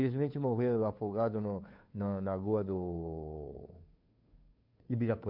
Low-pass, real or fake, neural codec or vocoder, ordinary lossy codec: 5.4 kHz; fake; codec, 16 kHz, 2 kbps, FunCodec, trained on Chinese and English, 25 frames a second; MP3, 48 kbps